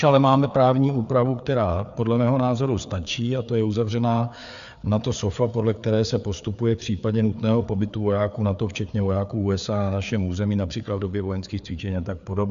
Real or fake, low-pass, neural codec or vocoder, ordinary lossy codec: fake; 7.2 kHz; codec, 16 kHz, 4 kbps, FreqCodec, larger model; MP3, 96 kbps